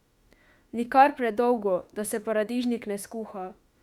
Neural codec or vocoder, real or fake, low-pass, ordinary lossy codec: autoencoder, 48 kHz, 32 numbers a frame, DAC-VAE, trained on Japanese speech; fake; 19.8 kHz; Opus, 64 kbps